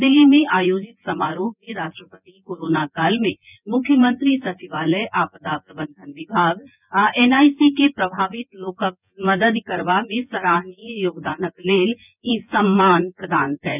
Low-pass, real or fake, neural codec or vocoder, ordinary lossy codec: 3.6 kHz; fake; vocoder, 24 kHz, 100 mel bands, Vocos; none